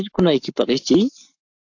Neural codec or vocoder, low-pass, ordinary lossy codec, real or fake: codec, 44.1 kHz, 7.8 kbps, Pupu-Codec; 7.2 kHz; AAC, 48 kbps; fake